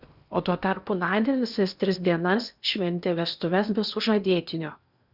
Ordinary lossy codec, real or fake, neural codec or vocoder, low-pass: Opus, 64 kbps; fake; codec, 16 kHz in and 24 kHz out, 0.8 kbps, FocalCodec, streaming, 65536 codes; 5.4 kHz